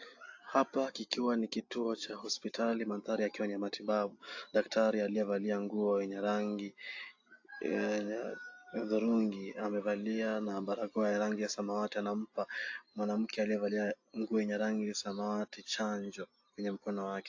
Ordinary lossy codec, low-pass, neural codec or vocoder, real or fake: AAC, 48 kbps; 7.2 kHz; none; real